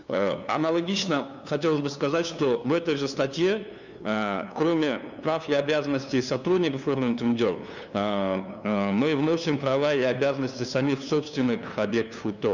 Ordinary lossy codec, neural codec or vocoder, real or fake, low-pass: none; codec, 16 kHz, 2 kbps, FunCodec, trained on LibriTTS, 25 frames a second; fake; 7.2 kHz